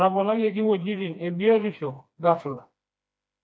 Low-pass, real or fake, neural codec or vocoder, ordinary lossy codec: none; fake; codec, 16 kHz, 2 kbps, FreqCodec, smaller model; none